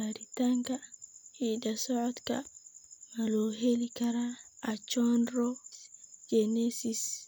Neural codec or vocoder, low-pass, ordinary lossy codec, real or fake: none; none; none; real